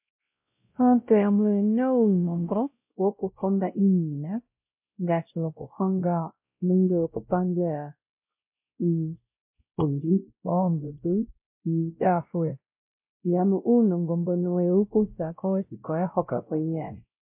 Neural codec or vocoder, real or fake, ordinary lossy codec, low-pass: codec, 16 kHz, 0.5 kbps, X-Codec, WavLM features, trained on Multilingual LibriSpeech; fake; MP3, 32 kbps; 3.6 kHz